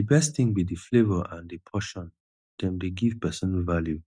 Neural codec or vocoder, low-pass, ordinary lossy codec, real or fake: none; 9.9 kHz; none; real